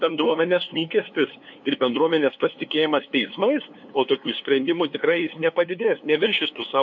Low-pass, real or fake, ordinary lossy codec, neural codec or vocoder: 7.2 kHz; fake; MP3, 48 kbps; codec, 16 kHz, 2 kbps, FunCodec, trained on LibriTTS, 25 frames a second